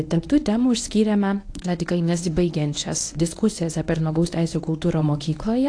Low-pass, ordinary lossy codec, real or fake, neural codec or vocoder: 9.9 kHz; AAC, 48 kbps; fake; codec, 24 kHz, 0.9 kbps, WavTokenizer, medium speech release version 1